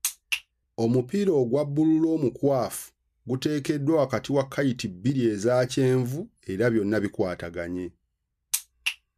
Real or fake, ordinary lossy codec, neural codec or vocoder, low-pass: real; MP3, 96 kbps; none; 14.4 kHz